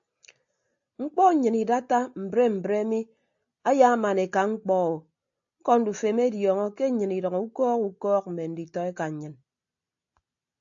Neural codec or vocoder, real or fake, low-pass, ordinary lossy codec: none; real; 7.2 kHz; AAC, 64 kbps